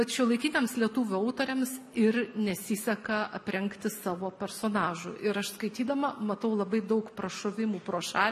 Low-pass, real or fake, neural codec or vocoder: 14.4 kHz; real; none